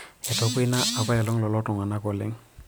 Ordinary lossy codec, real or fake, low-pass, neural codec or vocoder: none; real; none; none